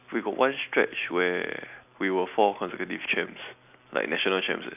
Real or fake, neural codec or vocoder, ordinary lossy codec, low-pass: real; none; none; 3.6 kHz